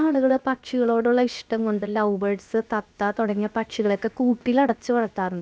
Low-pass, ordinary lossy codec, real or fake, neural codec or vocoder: none; none; fake; codec, 16 kHz, about 1 kbps, DyCAST, with the encoder's durations